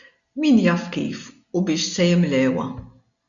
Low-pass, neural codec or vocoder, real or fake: 7.2 kHz; none; real